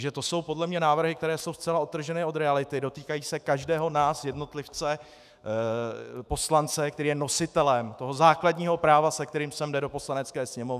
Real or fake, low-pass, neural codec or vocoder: fake; 14.4 kHz; autoencoder, 48 kHz, 128 numbers a frame, DAC-VAE, trained on Japanese speech